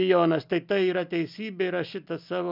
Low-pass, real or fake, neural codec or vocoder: 5.4 kHz; real; none